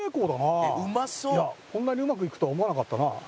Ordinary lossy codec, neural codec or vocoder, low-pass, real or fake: none; none; none; real